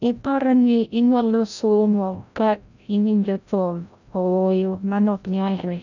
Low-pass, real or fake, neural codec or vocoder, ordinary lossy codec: 7.2 kHz; fake; codec, 16 kHz, 0.5 kbps, FreqCodec, larger model; none